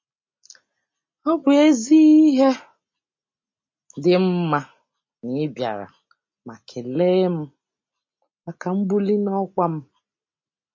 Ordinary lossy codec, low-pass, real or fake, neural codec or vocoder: MP3, 32 kbps; 7.2 kHz; real; none